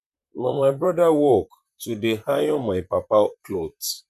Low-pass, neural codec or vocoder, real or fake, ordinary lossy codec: 14.4 kHz; vocoder, 44.1 kHz, 128 mel bands, Pupu-Vocoder; fake; none